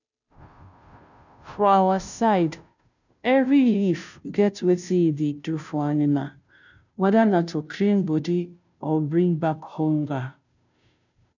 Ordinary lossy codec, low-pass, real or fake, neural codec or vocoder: none; 7.2 kHz; fake; codec, 16 kHz, 0.5 kbps, FunCodec, trained on Chinese and English, 25 frames a second